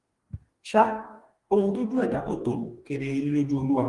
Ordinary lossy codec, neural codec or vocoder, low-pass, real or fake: Opus, 24 kbps; codec, 44.1 kHz, 2.6 kbps, DAC; 10.8 kHz; fake